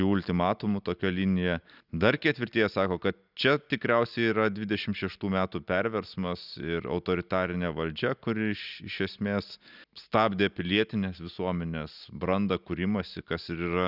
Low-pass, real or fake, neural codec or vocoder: 5.4 kHz; real; none